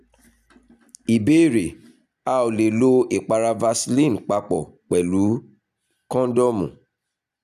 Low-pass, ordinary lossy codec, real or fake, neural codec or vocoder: 14.4 kHz; none; real; none